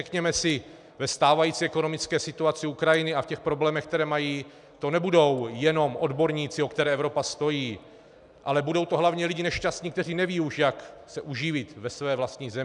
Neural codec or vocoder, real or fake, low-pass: none; real; 10.8 kHz